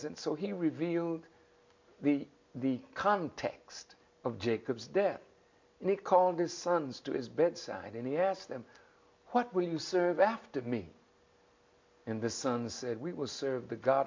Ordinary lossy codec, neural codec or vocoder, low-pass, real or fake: MP3, 48 kbps; none; 7.2 kHz; real